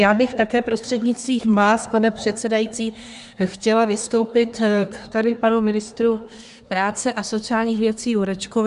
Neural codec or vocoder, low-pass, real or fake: codec, 24 kHz, 1 kbps, SNAC; 10.8 kHz; fake